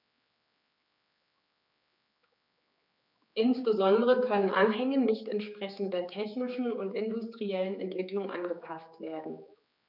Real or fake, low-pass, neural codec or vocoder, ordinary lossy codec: fake; 5.4 kHz; codec, 16 kHz, 4 kbps, X-Codec, HuBERT features, trained on general audio; none